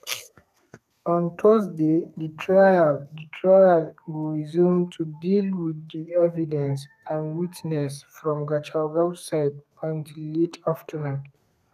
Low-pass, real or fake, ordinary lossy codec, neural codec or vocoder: 14.4 kHz; fake; none; codec, 32 kHz, 1.9 kbps, SNAC